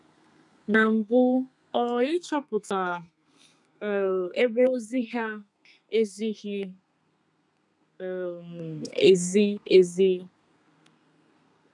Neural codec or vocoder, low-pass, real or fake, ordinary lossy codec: codec, 32 kHz, 1.9 kbps, SNAC; 10.8 kHz; fake; none